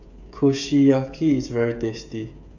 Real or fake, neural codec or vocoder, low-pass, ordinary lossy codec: fake; codec, 16 kHz, 16 kbps, FreqCodec, smaller model; 7.2 kHz; none